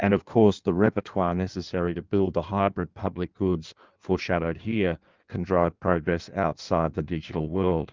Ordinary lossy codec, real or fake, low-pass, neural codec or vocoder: Opus, 24 kbps; fake; 7.2 kHz; codec, 16 kHz in and 24 kHz out, 1.1 kbps, FireRedTTS-2 codec